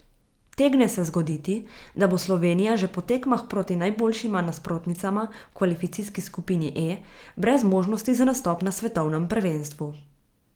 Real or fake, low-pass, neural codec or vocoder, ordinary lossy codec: fake; 19.8 kHz; vocoder, 44.1 kHz, 128 mel bands every 512 samples, BigVGAN v2; Opus, 24 kbps